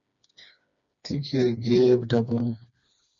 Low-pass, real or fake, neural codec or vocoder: 7.2 kHz; fake; codec, 16 kHz, 2 kbps, FreqCodec, smaller model